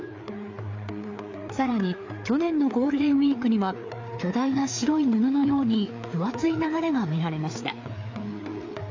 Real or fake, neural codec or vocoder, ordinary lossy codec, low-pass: fake; codec, 16 kHz, 4 kbps, FreqCodec, larger model; AAC, 48 kbps; 7.2 kHz